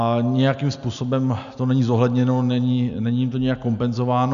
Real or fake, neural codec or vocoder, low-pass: real; none; 7.2 kHz